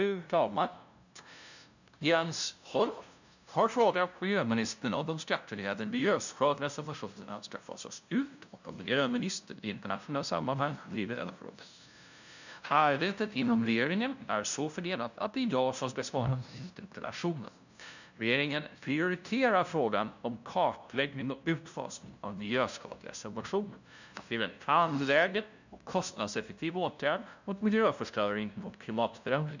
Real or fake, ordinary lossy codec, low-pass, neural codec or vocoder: fake; none; 7.2 kHz; codec, 16 kHz, 0.5 kbps, FunCodec, trained on LibriTTS, 25 frames a second